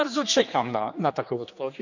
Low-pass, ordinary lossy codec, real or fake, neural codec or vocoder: 7.2 kHz; none; fake; codec, 16 kHz, 2 kbps, X-Codec, HuBERT features, trained on general audio